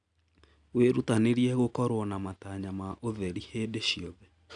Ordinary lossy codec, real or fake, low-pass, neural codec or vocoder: none; real; 9.9 kHz; none